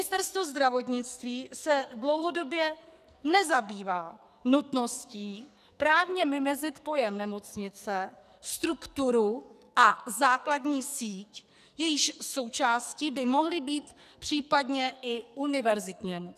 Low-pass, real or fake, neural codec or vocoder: 14.4 kHz; fake; codec, 44.1 kHz, 2.6 kbps, SNAC